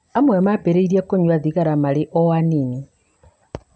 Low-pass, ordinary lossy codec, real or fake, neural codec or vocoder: none; none; real; none